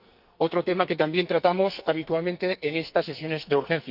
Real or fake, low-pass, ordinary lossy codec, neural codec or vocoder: fake; 5.4 kHz; Opus, 64 kbps; codec, 44.1 kHz, 2.6 kbps, SNAC